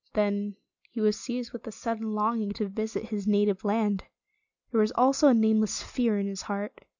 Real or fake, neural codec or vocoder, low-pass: real; none; 7.2 kHz